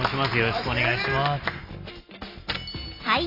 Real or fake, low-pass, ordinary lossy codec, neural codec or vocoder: real; 5.4 kHz; AAC, 32 kbps; none